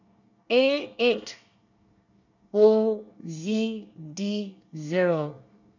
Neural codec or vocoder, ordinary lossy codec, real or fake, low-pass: codec, 24 kHz, 1 kbps, SNAC; none; fake; 7.2 kHz